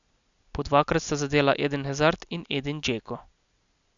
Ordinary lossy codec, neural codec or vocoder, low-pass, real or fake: MP3, 96 kbps; none; 7.2 kHz; real